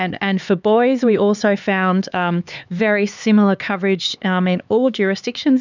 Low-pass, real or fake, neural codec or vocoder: 7.2 kHz; fake; codec, 16 kHz, 4 kbps, X-Codec, HuBERT features, trained on LibriSpeech